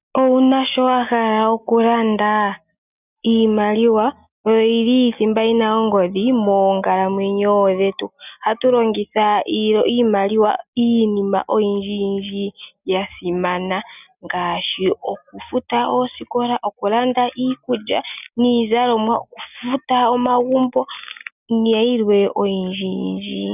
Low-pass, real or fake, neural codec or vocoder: 3.6 kHz; real; none